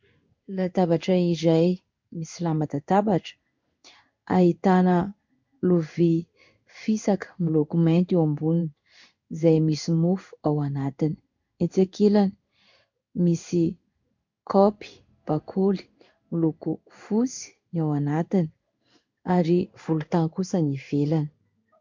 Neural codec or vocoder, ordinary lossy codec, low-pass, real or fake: codec, 16 kHz in and 24 kHz out, 1 kbps, XY-Tokenizer; MP3, 64 kbps; 7.2 kHz; fake